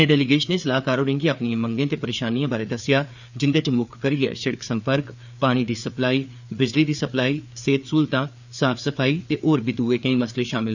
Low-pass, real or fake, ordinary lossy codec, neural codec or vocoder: 7.2 kHz; fake; none; codec, 16 kHz, 4 kbps, FreqCodec, larger model